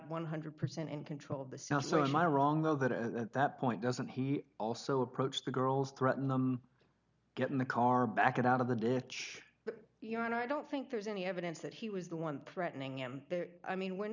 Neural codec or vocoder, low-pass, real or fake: none; 7.2 kHz; real